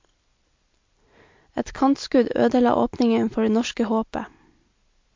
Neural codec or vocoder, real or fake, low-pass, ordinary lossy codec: none; real; 7.2 kHz; MP3, 48 kbps